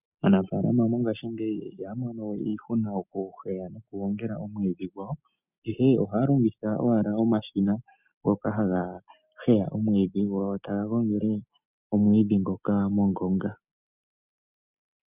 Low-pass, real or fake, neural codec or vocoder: 3.6 kHz; real; none